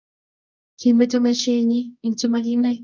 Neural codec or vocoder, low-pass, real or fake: codec, 24 kHz, 0.9 kbps, WavTokenizer, medium music audio release; 7.2 kHz; fake